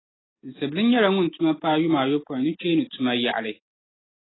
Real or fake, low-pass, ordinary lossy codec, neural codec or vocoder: real; 7.2 kHz; AAC, 16 kbps; none